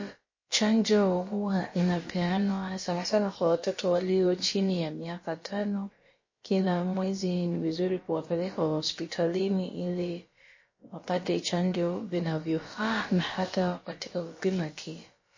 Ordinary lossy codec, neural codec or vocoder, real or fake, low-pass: MP3, 32 kbps; codec, 16 kHz, about 1 kbps, DyCAST, with the encoder's durations; fake; 7.2 kHz